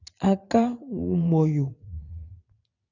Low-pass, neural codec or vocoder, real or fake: 7.2 kHz; vocoder, 22.05 kHz, 80 mel bands, WaveNeXt; fake